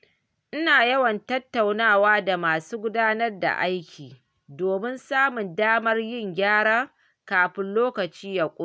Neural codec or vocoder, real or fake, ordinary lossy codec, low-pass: none; real; none; none